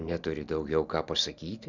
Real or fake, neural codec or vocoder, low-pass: real; none; 7.2 kHz